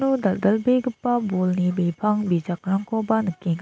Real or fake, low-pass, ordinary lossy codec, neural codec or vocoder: real; none; none; none